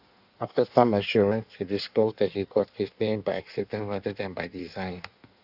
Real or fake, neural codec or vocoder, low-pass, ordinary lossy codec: fake; codec, 16 kHz in and 24 kHz out, 1.1 kbps, FireRedTTS-2 codec; 5.4 kHz; none